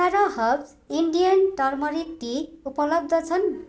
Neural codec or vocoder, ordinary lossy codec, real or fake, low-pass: none; none; real; none